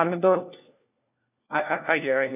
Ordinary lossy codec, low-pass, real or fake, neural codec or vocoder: none; 3.6 kHz; fake; codec, 16 kHz, 1 kbps, FunCodec, trained on LibriTTS, 50 frames a second